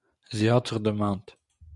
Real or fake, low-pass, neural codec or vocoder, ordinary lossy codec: real; 10.8 kHz; none; MP3, 96 kbps